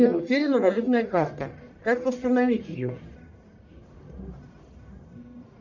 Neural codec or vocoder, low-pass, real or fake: codec, 44.1 kHz, 1.7 kbps, Pupu-Codec; 7.2 kHz; fake